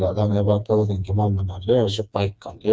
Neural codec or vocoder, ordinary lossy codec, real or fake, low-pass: codec, 16 kHz, 2 kbps, FreqCodec, smaller model; none; fake; none